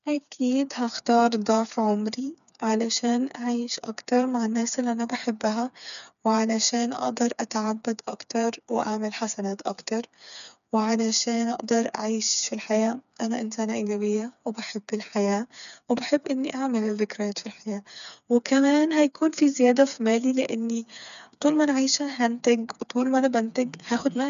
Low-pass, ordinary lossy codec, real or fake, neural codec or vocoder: 7.2 kHz; none; fake; codec, 16 kHz, 4 kbps, FreqCodec, smaller model